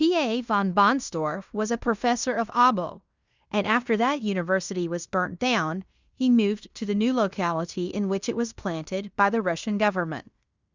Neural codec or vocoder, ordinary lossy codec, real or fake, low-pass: codec, 16 kHz in and 24 kHz out, 0.9 kbps, LongCat-Audio-Codec, fine tuned four codebook decoder; Opus, 64 kbps; fake; 7.2 kHz